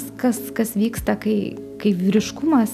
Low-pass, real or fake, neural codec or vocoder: 14.4 kHz; real; none